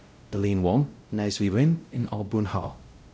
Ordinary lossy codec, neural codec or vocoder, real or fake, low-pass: none; codec, 16 kHz, 0.5 kbps, X-Codec, WavLM features, trained on Multilingual LibriSpeech; fake; none